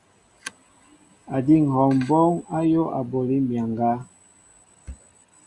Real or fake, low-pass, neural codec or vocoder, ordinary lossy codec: real; 10.8 kHz; none; Opus, 64 kbps